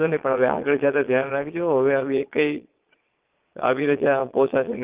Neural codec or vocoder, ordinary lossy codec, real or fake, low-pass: vocoder, 22.05 kHz, 80 mel bands, Vocos; Opus, 32 kbps; fake; 3.6 kHz